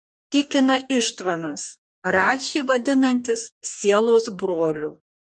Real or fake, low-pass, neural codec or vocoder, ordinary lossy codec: fake; 10.8 kHz; codec, 44.1 kHz, 2.6 kbps, DAC; MP3, 96 kbps